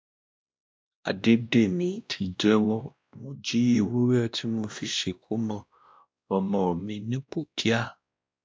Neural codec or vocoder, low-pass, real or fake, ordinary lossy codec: codec, 16 kHz, 1 kbps, X-Codec, WavLM features, trained on Multilingual LibriSpeech; none; fake; none